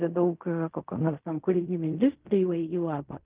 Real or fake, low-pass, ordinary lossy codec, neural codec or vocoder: fake; 3.6 kHz; Opus, 24 kbps; codec, 16 kHz in and 24 kHz out, 0.4 kbps, LongCat-Audio-Codec, fine tuned four codebook decoder